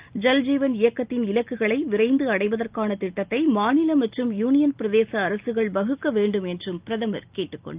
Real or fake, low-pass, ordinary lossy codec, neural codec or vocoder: real; 3.6 kHz; Opus, 24 kbps; none